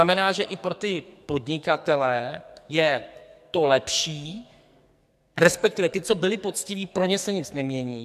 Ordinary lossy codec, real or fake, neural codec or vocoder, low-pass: MP3, 96 kbps; fake; codec, 44.1 kHz, 2.6 kbps, SNAC; 14.4 kHz